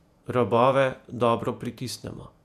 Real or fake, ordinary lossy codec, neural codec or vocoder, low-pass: fake; none; vocoder, 48 kHz, 128 mel bands, Vocos; 14.4 kHz